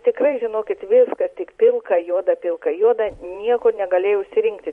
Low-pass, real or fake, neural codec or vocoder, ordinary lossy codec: 19.8 kHz; fake; vocoder, 44.1 kHz, 128 mel bands every 512 samples, BigVGAN v2; MP3, 48 kbps